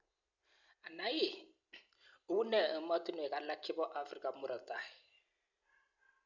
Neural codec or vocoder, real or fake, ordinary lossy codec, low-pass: none; real; none; 7.2 kHz